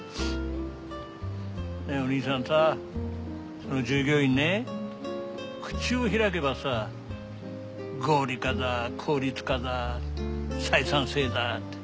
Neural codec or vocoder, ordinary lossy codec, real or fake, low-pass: none; none; real; none